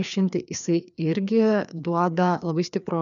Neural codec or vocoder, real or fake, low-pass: codec, 16 kHz, 2 kbps, FreqCodec, larger model; fake; 7.2 kHz